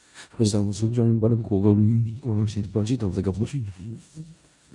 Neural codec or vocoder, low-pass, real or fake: codec, 16 kHz in and 24 kHz out, 0.4 kbps, LongCat-Audio-Codec, four codebook decoder; 10.8 kHz; fake